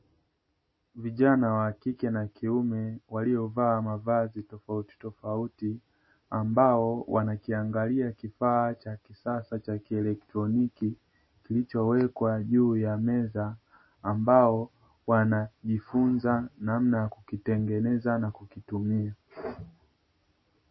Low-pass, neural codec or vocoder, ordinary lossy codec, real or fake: 7.2 kHz; none; MP3, 24 kbps; real